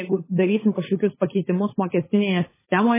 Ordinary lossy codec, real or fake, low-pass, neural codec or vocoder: MP3, 16 kbps; fake; 3.6 kHz; codec, 16 kHz, 16 kbps, FunCodec, trained on LibriTTS, 50 frames a second